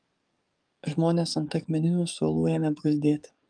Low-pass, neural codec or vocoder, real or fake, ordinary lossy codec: 9.9 kHz; codec, 44.1 kHz, 7.8 kbps, DAC; fake; Opus, 32 kbps